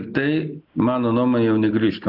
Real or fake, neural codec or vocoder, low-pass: real; none; 5.4 kHz